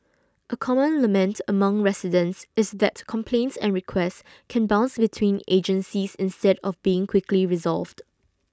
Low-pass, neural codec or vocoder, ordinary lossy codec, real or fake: none; none; none; real